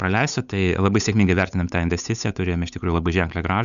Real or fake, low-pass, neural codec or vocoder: fake; 7.2 kHz; codec, 16 kHz, 8 kbps, FunCodec, trained on Chinese and English, 25 frames a second